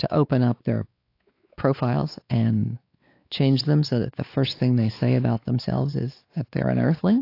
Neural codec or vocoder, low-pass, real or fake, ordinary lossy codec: codec, 16 kHz, 4 kbps, X-Codec, WavLM features, trained on Multilingual LibriSpeech; 5.4 kHz; fake; AAC, 32 kbps